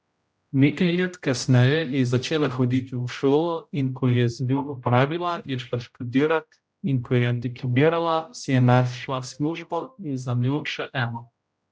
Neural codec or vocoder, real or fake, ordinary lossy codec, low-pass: codec, 16 kHz, 0.5 kbps, X-Codec, HuBERT features, trained on general audio; fake; none; none